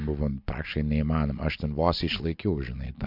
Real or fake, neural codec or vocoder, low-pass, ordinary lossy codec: real; none; 5.4 kHz; MP3, 48 kbps